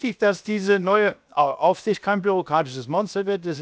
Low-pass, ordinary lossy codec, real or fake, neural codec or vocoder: none; none; fake; codec, 16 kHz, 0.7 kbps, FocalCodec